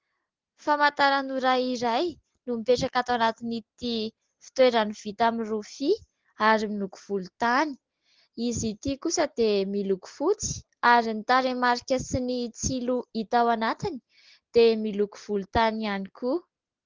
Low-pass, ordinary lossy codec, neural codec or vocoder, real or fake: 7.2 kHz; Opus, 16 kbps; none; real